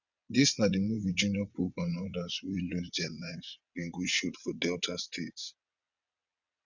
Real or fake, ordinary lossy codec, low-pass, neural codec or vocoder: fake; none; 7.2 kHz; vocoder, 22.05 kHz, 80 mel bands, WaveNeXt